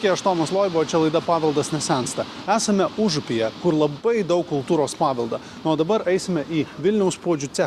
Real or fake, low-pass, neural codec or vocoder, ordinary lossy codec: real; 14.4 kHz; none; Opus, 64 kbps